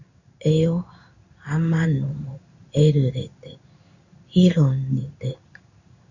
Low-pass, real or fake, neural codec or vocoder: 7.2 kHz; fake; codec, 16 kHz in and 24 kHz out, 1 kbps, XY-Tokenizer